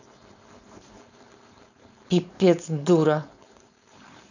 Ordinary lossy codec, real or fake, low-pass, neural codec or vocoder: none; fake; 7.2 kHz; codec, 16 kHz, 4.8 kbps, FACodec